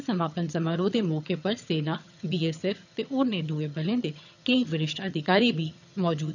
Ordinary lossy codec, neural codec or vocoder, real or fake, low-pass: none; vocoder, 22.05 kHz, 80 mel bands, HiFi-GAN; fake; 7.2 kHz